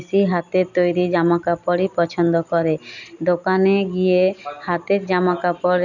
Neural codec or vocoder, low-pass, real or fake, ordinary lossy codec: none; 7.2 kHz; real; none